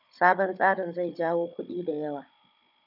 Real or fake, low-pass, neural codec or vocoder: fake; 5.4 kHz; codec, 16 kHz, 16 kbps, FunCodec, trained on Chinese and English, 50 frames a second